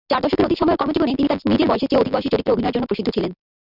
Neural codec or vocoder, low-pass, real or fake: vocoder, 44.1 kHz, 128 mel bands every 256 samples, BigVGAN v2; 5.4 kHz; fake